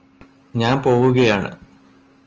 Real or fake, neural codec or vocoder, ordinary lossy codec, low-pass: real; none; Opus, 24 kbps; 7.2 kHz